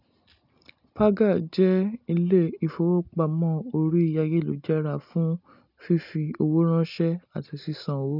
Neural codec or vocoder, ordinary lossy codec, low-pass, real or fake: none; none; 5.4 kHz; real